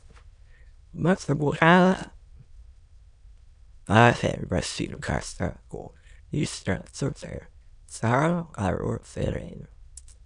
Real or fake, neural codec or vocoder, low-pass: fake; autoencoder, 22.05 kHz, a latent of 192 numbers a frame, VITS, trained on many speakers; 9.9 kHz